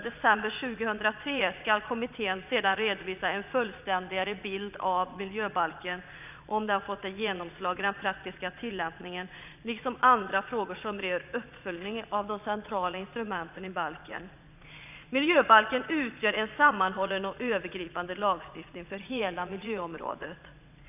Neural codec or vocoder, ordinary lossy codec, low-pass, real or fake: vocoder, 22.05 kHz, 80 mel bands, Vocos; none; 3.6 kHz; fake